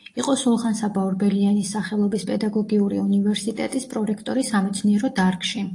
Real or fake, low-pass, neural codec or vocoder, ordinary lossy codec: real; 10.8 kHz; none; AAC, 48 kbps